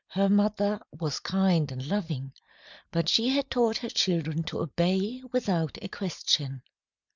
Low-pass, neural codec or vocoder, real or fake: 7.2 kHz; none; real